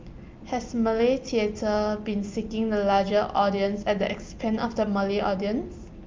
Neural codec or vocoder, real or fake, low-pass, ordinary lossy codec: none; real; 7.2 kHz; Opus, 24 kbps